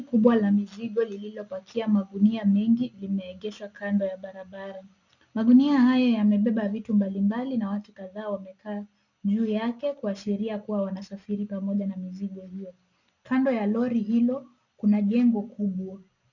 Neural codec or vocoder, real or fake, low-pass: none; real; 7.2 kHz